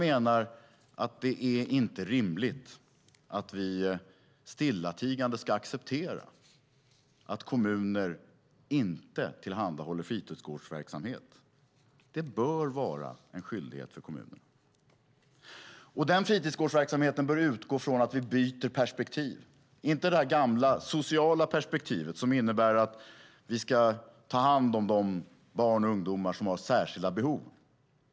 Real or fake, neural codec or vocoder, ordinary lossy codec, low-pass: real; none; none; none